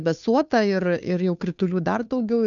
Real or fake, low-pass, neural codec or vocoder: fake; 7.2 kHz; codec, 16 kHz, 2 kbps, FunCodec, trained on Chinese and English, 25 frames a second